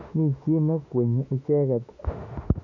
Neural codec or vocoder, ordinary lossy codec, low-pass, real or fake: autoencoder, 48 kHz, 32 numbers a frame, DAC-VAE, trained on Japanese speech; none; 7.2 kHz; fake